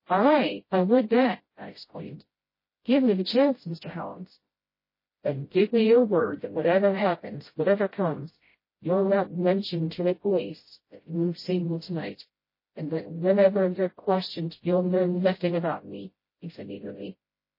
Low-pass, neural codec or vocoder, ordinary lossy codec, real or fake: 5.4 kHz; codec, 16 kHz, 0.5 kbps, FreqCodec, smaller model; MP3, 24 kbps; fake